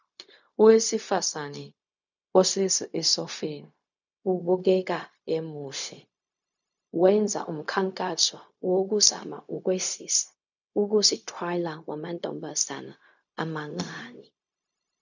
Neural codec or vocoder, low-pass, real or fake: codec, 16 kHz, 0.4 kbps, LongCat-Audio-Codec; 7.2 kHz; fake